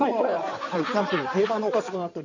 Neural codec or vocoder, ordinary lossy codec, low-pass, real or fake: codec, 16 kHz in and 24 kHz out, 2.2 kbps, FireRedTTS-2 codec; none; 7.2 kHz; fake